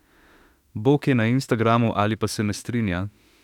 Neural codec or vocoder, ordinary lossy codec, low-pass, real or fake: autoencoder, 48 kHz, 32 numbers a frame, DAC-VAE, trained on Japanese speech; none; 19.8 kHz; fake